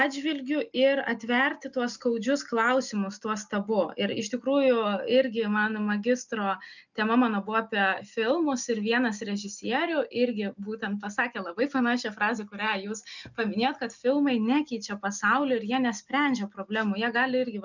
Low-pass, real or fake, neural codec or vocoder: 7.2 kHz; real; none